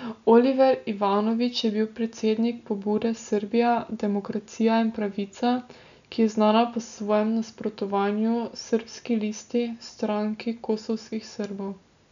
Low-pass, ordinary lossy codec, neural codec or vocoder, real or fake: 7.2 kHz; none; none; real